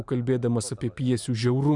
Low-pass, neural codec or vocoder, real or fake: 10.8 kHz; vocoder, 44.1 kHz, 128 mel bands every 512 samples, BigVGAN v2; fake